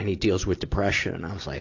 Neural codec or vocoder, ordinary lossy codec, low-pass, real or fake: none; AAC, 48 kbps; 7.2 kHz; real